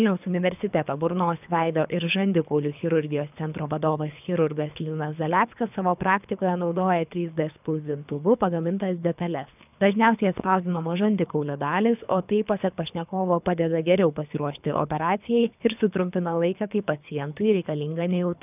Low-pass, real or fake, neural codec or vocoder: 3.6 kHz; fake; codec, 24 kHz, 3 kbps, HILCodec